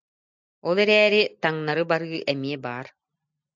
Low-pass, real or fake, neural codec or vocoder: 7.2 kHz; real; none